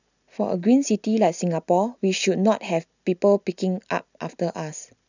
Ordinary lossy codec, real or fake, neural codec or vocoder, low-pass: none; real; none; 7.2 kHz